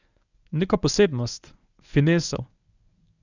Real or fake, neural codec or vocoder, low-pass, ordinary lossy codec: fake; codec, 24 kHz, 0.9 kbps, WavTokenizer, medium speech release version 1; 7.2 kHz; none